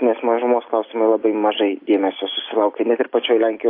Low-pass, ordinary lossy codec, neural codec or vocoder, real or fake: 5.4 kHz; AAC, 32 kbps; none; real